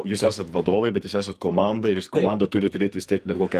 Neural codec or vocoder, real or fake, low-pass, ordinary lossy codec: codec, 44.1 kHz, 2.6 kbps, SNAC; fake; 14.4 kHz; Opus, 32 kbps